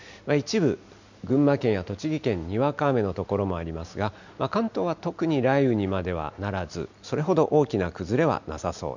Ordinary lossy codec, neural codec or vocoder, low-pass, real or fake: none; none; 7.2 kHz; real